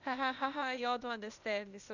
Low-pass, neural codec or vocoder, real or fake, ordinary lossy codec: 7.2 kHz; codec, 16 kHz, 0.8 kbps, ZipCodec; fake; none